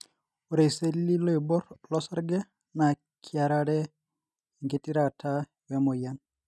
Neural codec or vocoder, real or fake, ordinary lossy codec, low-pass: none; real; none; none